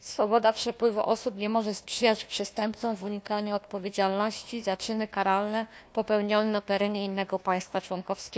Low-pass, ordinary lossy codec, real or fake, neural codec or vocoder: none; none; fake; codec, 16 kHz, 1 kbps, FunCodec, trained on Chinese and English, 50 frames a second